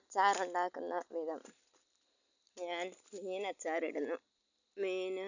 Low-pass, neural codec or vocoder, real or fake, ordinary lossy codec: 7.2 kHz; none; real; none